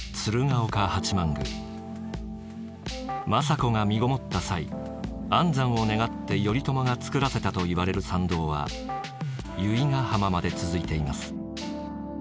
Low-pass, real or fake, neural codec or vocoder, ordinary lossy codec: none; real; none; none